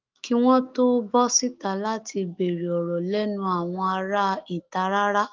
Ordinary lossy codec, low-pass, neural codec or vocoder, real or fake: Opus, 24 kbps; 7.2 kHz; autoencoder, 48 kHz, 128 numbers a frame, DAC-VAE, trained on Japanese speech; fake